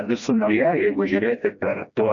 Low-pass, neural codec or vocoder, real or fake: 7.2 kHz; codec, 16 kHz, 1 kbps, FreqCodec, smaller model; fake